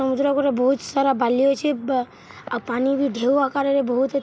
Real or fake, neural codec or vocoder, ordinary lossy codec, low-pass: real; none; none; none